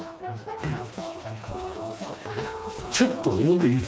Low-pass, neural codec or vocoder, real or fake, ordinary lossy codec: none; codec, 16 kHz, 2 kbps, FreqCodec, smaller model; fake; none